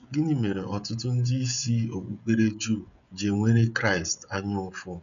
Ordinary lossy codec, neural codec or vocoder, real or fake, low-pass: none; codec, 16 kHz, 16 kbps, FreqCodec, smaller model; fake; 7.2 kHz